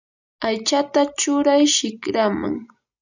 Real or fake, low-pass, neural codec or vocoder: real; 7.2 kHz; none